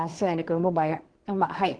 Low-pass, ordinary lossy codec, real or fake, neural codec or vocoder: 9.9 kHz; Opus, 16 kbps; fake; codec, 24 kHz, 1 kbps, SNAC